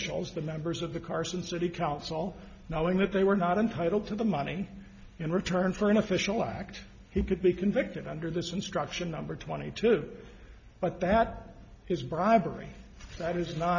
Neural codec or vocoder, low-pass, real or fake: none; 7.2 kHz; real